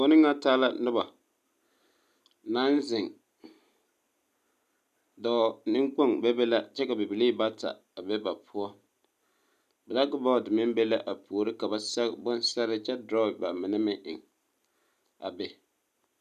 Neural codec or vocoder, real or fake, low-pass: none; real; 14.4 kHz